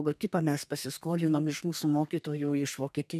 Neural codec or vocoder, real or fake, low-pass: codec, 32 kHz, 1.9 kbps, SNAC; fake; 14.4 kHz